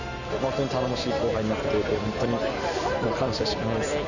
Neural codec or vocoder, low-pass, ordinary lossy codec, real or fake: none; 7.2 kHz; none; real